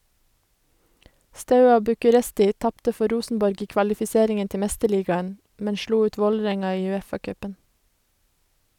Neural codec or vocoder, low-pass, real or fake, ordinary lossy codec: none; 19.8 kHz; real; none